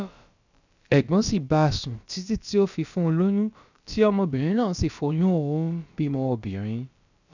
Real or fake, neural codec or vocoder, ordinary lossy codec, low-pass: fake; codec, 16 kHz, about 1 kbps, DyCAST, with the encoder's durations; none; 7.2 kHz